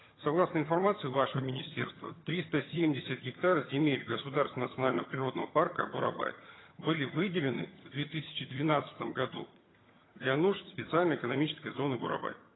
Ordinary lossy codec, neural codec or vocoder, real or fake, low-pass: AAC, 16 kbps; vocoder, 22.05 kHz, 80 mel bands, HiFi-GAN; fake; 7.2 kHz